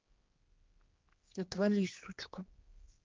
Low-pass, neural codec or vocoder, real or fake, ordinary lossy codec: 7.2 kHz; codec, 16 kHz, 2 kbps, X-Codec, HuBERT features, trained on general audio; fake; Opus, 32 kbps